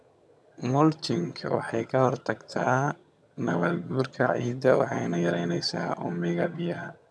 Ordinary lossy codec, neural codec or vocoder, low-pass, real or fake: none; vocoder, 22.05 kHz, 80 mel bands, HiFi-GAN; none; fake